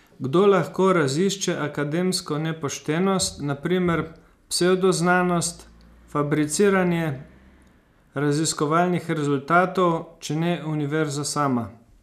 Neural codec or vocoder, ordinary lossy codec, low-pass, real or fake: none; none; 14.4 kHz; real